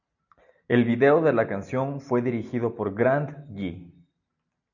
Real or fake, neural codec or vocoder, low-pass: real; none; 7.2 kHz